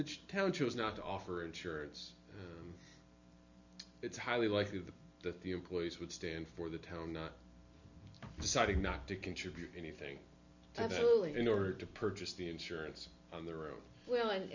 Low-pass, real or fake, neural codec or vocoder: 7.2 kHz; real; none